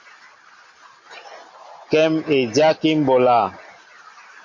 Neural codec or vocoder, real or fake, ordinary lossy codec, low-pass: none; real; MP3, 48 kbps; 7.2 kHz